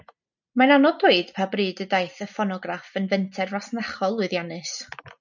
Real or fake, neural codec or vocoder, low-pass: real; none; 7.2 kHz